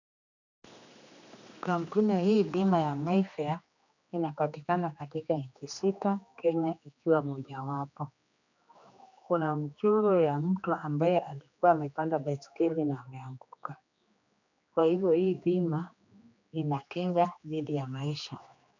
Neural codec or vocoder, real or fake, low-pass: codec, 16 kHz, 2 kbps, X-Codec, HuBERT features, trained on general audio; fake; 7.2 kHz